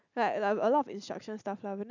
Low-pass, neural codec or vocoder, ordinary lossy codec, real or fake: 7.2 kHz; none; none; real